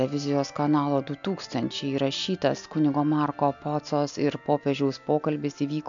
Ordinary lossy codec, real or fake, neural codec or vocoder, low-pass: MP3, 64 kbps; real; none; 7.2 kHz